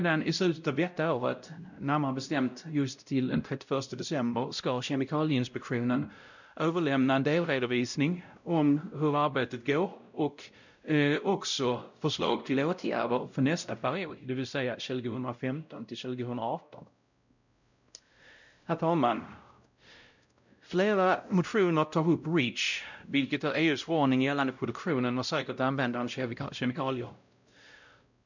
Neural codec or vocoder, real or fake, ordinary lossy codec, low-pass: codec, 16 kHz, 0.5 kbps, X-Codec, WavLM features, trained on Multilingual LibriSpeech; fake; none; 7.2 kHz